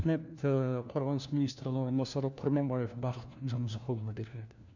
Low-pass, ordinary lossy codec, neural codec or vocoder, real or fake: 7.2 kHz; none; codec, 16 kHz, 1 kbps, FunCodec, trained on LibriTTS, 50 frames a second; fake